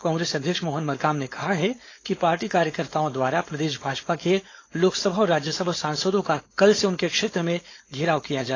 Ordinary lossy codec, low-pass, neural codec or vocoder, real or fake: AAC, 32 kbps; 7.2 kHz; codec, 16 kHz, 4.8 kbps, FACodec; fake